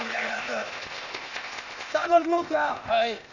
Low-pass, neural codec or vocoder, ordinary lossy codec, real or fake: 7.2 kHz; codec, 16 kHz, 0.8 kbps, ZipCodec; none; fake